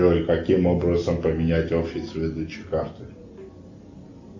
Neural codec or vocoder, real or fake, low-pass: none; real; 7.2 kHz